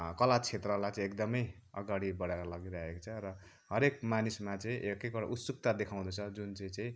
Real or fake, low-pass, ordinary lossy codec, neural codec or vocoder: real; none; none; none